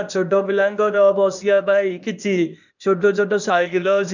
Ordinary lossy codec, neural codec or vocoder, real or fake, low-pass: none; codec, 16 kHz, 0.8 kbps, ZipCodec; fake; 7.2 kHz